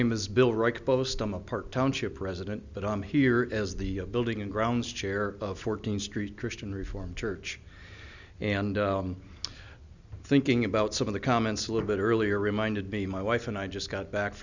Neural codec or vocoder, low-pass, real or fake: none; 7.2 kHz; real